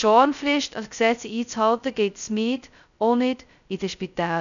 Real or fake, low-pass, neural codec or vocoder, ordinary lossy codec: fake; 7.2 kHz; codec, 16 kHz, 0.2 kbps, FocalCodec; none